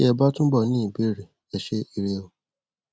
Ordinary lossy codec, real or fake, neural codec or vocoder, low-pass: none; real; none; none